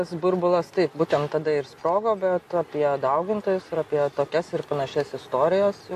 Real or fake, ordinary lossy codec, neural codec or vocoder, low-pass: real; AAC, 64 kbps; none; 14.4 kHz